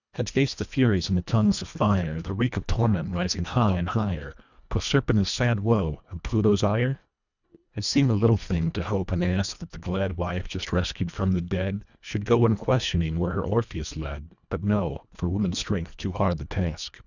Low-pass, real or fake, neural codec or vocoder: 7.2 kHz; fake; codec, 24 kHz, 1.5 kbps, HILCodec